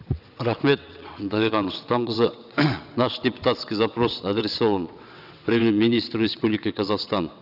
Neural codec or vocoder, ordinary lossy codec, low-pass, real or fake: vocoder, 22.05 kHz, 80 mel bands, WaveNeXt; none; 5.4 kHz; fake